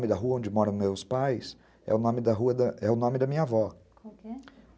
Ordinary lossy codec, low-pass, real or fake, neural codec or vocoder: none; none; real; none